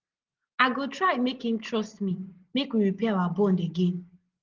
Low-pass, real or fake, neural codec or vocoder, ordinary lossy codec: 7.2 kHz; real; none; Opus, 16 kbps